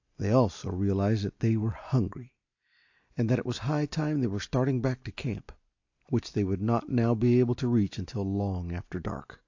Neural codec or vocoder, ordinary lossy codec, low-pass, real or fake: none; AAC, 48 kbps; 7.2 kHz; real